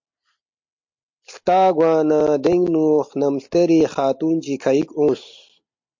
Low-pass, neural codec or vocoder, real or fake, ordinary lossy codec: 7.2 kHz; none; real; MP3, 48 kbps